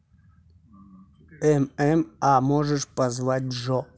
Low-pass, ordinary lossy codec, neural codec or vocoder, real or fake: none; none; none; real